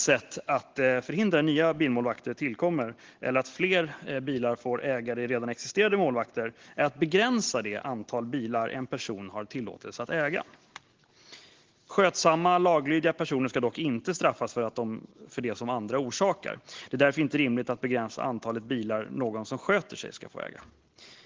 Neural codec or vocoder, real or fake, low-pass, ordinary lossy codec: none; real; 7.2 kHz; Opus, 16 kbps